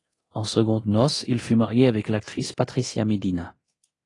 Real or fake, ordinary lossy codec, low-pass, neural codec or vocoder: fake; AAC, 32 kbps; 10.8 kHz; codec, 24 kHz, 0.9 kbps, DualCodec